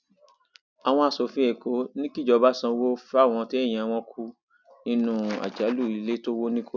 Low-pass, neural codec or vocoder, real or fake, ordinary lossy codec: 7.2 kHz; none; real; none